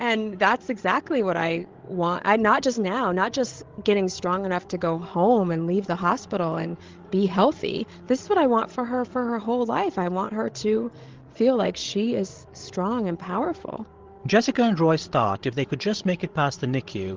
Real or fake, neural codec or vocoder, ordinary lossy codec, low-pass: fake; codec, 16 kHz, 16 kbps, FreqCodec, larger model; Opus, 16 kbps; 7.2 kHz